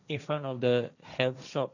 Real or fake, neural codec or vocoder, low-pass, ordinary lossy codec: fake; codec, 16 kHz, 1.1 kbps, Voila-Tokenizer; 7.2 kHz; none